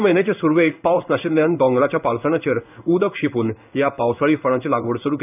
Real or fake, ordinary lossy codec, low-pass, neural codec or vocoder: fake; none; 3.6 kHz; codec, 16 kHz in and 24 kHz out, 1 kbps, XY-Tokenizer